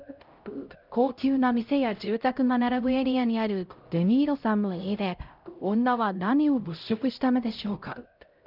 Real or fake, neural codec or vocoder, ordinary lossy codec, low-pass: fake; codec, 16 kHz, 0.5 kbps, X-Codec, HuBERT features, trained on LibriSpeech; Opus, 24 kbps; 5.4 kHz